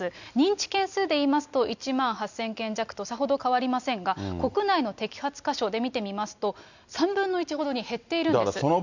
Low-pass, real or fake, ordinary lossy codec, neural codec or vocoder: 7.2 kHz; real; none; none